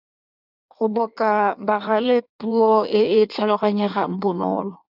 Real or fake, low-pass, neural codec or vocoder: fake; 5.4 kHz; codec, 16 kHz in and 24 kHz out, 1.1 kbps, FireRedTTS-2 codec